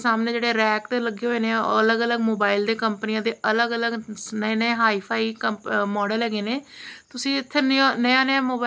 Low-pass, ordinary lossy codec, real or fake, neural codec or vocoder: none; none; real; none